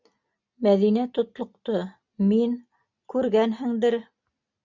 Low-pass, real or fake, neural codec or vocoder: 7.2 kHz; real; none